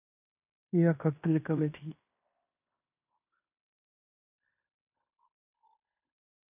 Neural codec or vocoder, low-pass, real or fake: codec, 16 kHz in and 24 kHz out, 0.9 kbps, LongCat-Audio-Codec, fine tuned four codebook decoder; 3.6 kHz; fake